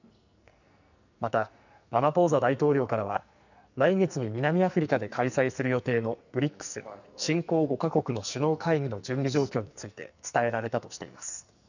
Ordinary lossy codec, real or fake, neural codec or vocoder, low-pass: none; fake; codec, 44.1 kHz, 2.6 kbps, SNAC; 7.2 kHz